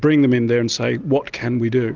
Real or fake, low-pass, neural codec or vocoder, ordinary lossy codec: real; 7.2 kHz; none; Opus, 24 kbps